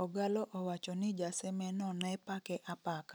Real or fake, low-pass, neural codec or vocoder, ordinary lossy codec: real; none; none; none